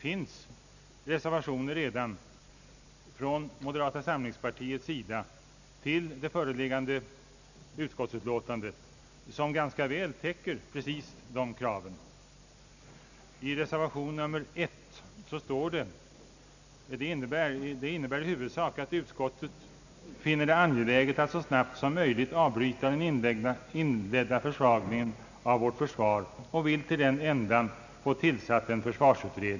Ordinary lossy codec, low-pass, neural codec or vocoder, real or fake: none; 7.2 kHz; none; real